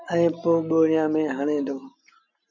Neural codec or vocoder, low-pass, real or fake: none; 7.2 kHz; real